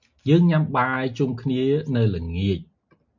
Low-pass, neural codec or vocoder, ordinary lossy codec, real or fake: 7.2 kHz; none; MP3, 64 kbps; real